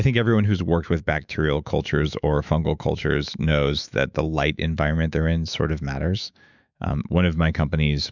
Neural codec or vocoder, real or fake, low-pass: autoencoder, 48 kHz, 128 numbers a frame, DAC-VAE, trained on Japanese speech; fake; 7.2 kHz